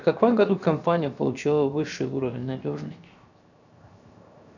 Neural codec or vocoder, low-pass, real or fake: codec, 16 kHz, 0.7 kbps, FocalCodec; 7.2 kHz; fake